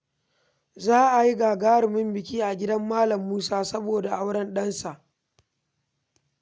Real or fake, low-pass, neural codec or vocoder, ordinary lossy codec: real; none; none; none